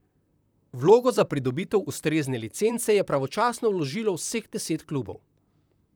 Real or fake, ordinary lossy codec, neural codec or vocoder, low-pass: fake; none; vocoder, 44.1 kHz, 128 mel bands, Pupu-Vocoder; none